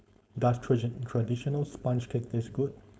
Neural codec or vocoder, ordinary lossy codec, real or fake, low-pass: codec, 16 kHz, 4.8 kbps, FACodec; none; fake; none